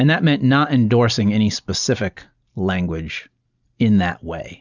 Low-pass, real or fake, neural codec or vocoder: 7.2 kHz; real; none